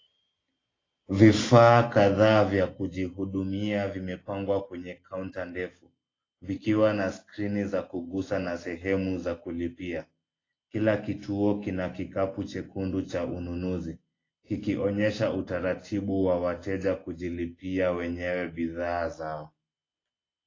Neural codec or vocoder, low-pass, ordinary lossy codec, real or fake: none; 7.2 kHz; AAC, 32 kbps; real